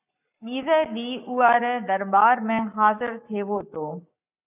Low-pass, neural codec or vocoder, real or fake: 3.6 kHz; codec, 44.1 kHz, 7.8 kbps, Pupu-Codec; fake